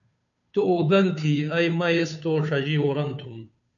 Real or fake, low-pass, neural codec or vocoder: fake; 7.2 kHz; codec, 16 kHz, 2 kbps, FunCodec, trained on Chinese and English, 25 frames a second